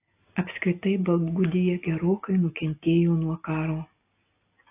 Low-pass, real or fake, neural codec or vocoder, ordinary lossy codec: 3.6 kHz; real; none; AAC, 24 kbps